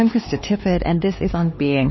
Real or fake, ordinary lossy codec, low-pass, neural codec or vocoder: fake; MP3, 24 kbps; 7.2 kHz; codec, 16 kHz, 4 kbps, X-Codec, HuBERT features, trained on LibriSpeech